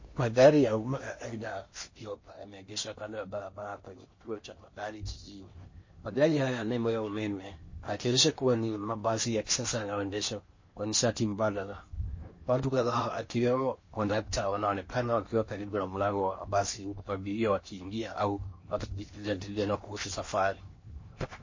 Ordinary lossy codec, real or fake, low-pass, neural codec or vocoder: MP3, 32 kbps; fake; 7.2 kHz; codec, 16 kHz in and 24 kHz out, 0.8 kbps, FocalCodec, streaming, 65536 codes